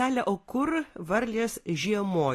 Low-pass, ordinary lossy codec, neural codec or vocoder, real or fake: 14.4 kHz; AAC, 48 kbps; none; real